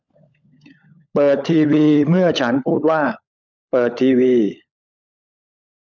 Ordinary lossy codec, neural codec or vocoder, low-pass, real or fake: none; codec, 16 kHz, 16 kbps, FunCodec, trained on LibriTTS, 50 frames a second; 7.2 kHz; fake